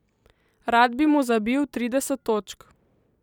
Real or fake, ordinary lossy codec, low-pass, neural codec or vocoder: fake; none; 19.8 kHz; vocoder, 44.1 kHz, 128 mel bands every 256 samples, BigVGAN v2